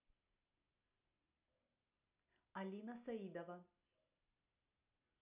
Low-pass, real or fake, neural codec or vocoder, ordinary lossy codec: 3.6 kHz; real; none; none